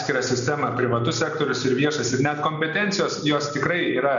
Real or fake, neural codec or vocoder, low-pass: real; none; 7.2 kHz